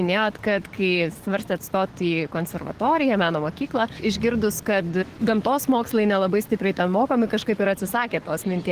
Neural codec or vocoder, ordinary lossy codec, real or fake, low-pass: codec, 44.1 kHz, 7.8 kbps, DAC; Opus, 16 kbps; fake; 14.4 kHz